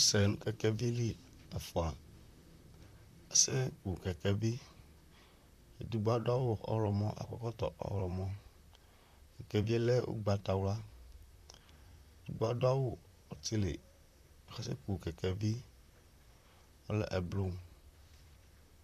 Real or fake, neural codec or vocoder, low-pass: fake; vocoder, 44.1 kHz, 128 mel bands, Pupu-Vocoder; 14.4 kHz